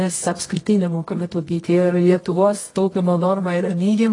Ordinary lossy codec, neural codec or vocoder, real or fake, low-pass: AAC, 32 kbps; codec, 24 kHz, 0.9 kbps, WavTokenizer, medium music audio release; fake; 10.8 kHz